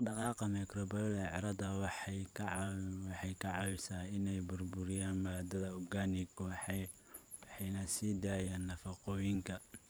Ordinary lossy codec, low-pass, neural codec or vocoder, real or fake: none; none; vocoder, 44.1 kHz, 128 mel bands every 512 samples, BigVGAN v2; fake